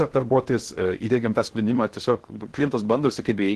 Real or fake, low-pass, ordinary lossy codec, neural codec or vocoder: fake; 10.8 kHz; Opus, 16 kbps; codec, 16 kHz in and 24 kHz out, 0.6 kbps, FocalCodec, streaming, 2048 codes